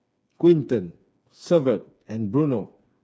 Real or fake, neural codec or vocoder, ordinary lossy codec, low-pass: fake; codec, 16 kHz, 4 kbps, FreqCodec, smaller model; none; none